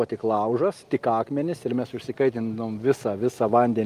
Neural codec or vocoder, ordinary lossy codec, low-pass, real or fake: none; Opus, 32 kbps; 14.4 kHz; real